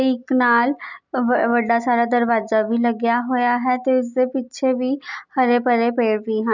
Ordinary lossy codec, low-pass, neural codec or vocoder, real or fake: none; 7.2 kHz; none; real